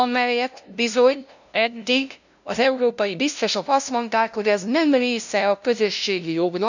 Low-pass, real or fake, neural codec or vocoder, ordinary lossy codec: 7.2 kHz; fake; codec, 16 kHz, 0.5 kbps, FunCodec, trained on LibriTTS, 25 frames a second; none